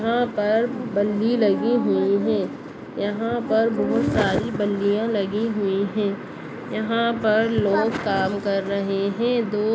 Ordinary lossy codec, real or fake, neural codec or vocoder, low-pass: none; real; none; none